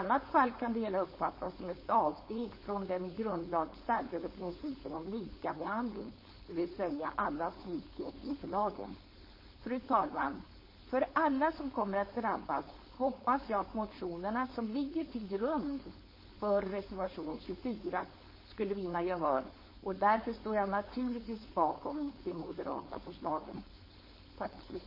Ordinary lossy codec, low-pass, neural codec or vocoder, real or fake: MP3, 24 kbps; 5.4 kHz; codec, 16 kHz, 4.8 kbps, FACodec; fake